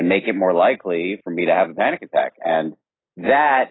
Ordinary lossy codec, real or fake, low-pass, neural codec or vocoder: AAC, 16 kbps; real; 7.2 kHz; none